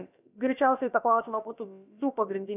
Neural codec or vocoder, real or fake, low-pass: codec, 16 kHz, about 1 kbps, DyCAST, with the encoder's durations; fake; 3.6 kHz